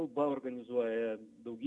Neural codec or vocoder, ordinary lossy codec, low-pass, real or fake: none; MP3, 96 kbps; 10.8 kHz; real